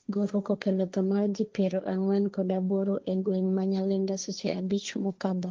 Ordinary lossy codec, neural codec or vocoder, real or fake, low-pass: Opus, 24 kbps; codec, 16 kHz, 1.1 kbps, Voila-Tokenizer; fake; 7.2 kHz